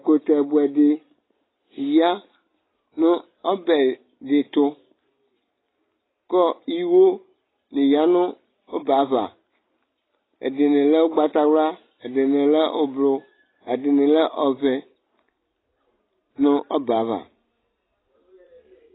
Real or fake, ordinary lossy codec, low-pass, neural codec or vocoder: real; AAC, 16 kbps; 7.2 kHz; none